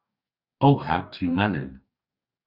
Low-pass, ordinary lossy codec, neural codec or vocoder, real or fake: 5.4 kHz; Opus, 64 kbps; codec, 44.1 kHz, 2.6 kbps, DAC; fake